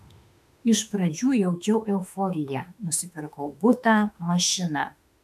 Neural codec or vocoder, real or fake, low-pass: autoencoder, 48 kHz, 32 numbers a frame, DAC-VAE, trained on Japanese speech; fake; 14.4 kHz